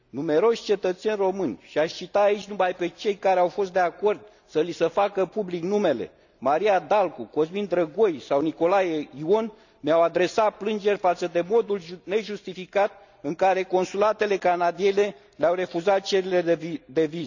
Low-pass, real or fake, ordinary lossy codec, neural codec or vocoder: 7.2 kHz; real; none; none